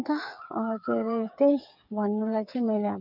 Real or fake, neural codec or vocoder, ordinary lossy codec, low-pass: fake; codec, 16 kHz, 8 kbps, FreqCodec, smaller model; none; 5.4 kHz